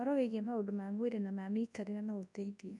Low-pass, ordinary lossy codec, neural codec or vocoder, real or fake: 10.8 kHz; none; codec, 24 kHz, 0.9 kbps, WavTokenizer, large speech release; fake